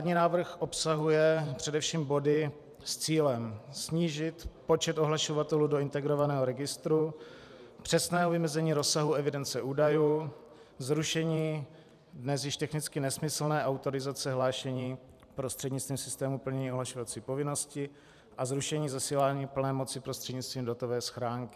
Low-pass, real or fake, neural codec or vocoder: 14.4 kHz; fake; vocoder, 44.1 kHz, 128 mel bands every 512 samples, BigVGAN v2